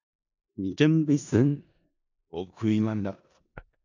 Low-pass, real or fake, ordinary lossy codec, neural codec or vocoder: 7.2 kHz; fake; AAC, 48 kbps; codec, 16 kHz in and 24 kHz out, 0.4 kbps, LongCat-Audio-Codec, four codebook decoder